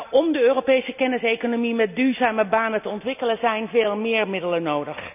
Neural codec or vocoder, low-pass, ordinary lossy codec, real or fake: none; 3.6 kHz; none; real